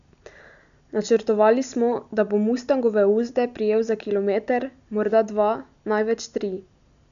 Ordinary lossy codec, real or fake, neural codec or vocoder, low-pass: none; real; none; 7.2 kHz